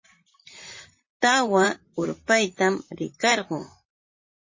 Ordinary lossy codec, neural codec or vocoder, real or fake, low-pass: MP3, 32 kbps; vocoder, 44.1 kHz, 128 mel bands, Pupu-Vocoder; fake; 7.2 kHz